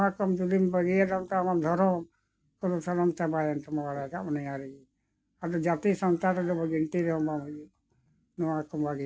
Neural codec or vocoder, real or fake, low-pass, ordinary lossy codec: none; real; none; none